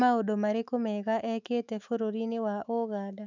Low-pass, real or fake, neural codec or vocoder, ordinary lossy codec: 7.2 kHz; fake; autoencoder, 48 kHz, 128 numbers a frame, DAC-VAE, trained on Japanese speech; none